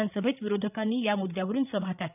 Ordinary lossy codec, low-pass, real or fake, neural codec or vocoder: none; 3.6 kHz; fake; codec, 16 kHz, 8 kbps, FreqCodec, larger model